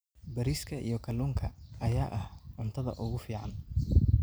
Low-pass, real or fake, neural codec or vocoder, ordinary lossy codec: none; real; none; none